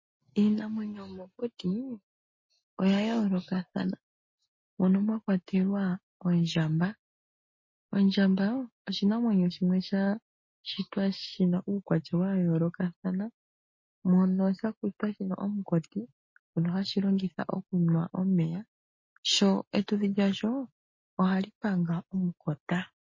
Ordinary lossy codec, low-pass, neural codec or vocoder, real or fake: MP3, 32 kbps; 7.2 kHz; none; real